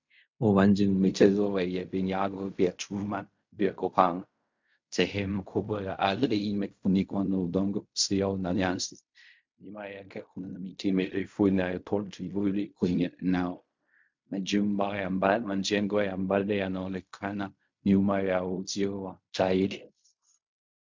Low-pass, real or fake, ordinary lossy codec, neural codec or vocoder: 7.2 kHz; fake; MP3, 64 kbps; codec, 16 kHz in and 24 kHz out, 0.4 kbps, LongCat-Audio-Codec, fine tuned four codebook decoder